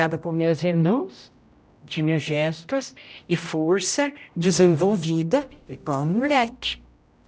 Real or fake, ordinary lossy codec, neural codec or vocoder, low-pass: fake; none; codec, 16 kHz, 0.5 kbps, X-Codec, HuBERT features, trained on general audio; none